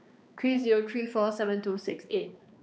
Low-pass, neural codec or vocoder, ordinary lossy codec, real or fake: none; codec, 16 kHz, 2 kbps, X-Codec, HuBERT features, trained on balanced general audio; none; fake